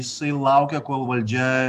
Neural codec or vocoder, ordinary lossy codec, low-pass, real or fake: none; AAC, 96 kbps; 14.4 kHz; real